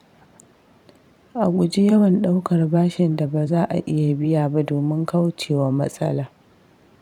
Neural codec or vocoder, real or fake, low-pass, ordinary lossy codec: vocoder, 44.1 kHz, 128 mel bands every 512 samples, BigVGAN v2; fake; 19.8 kHz; none